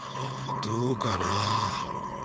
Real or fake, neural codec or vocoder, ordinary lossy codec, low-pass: fake; codec, 16 kHz, 4.8 kbps, FACodec; none; none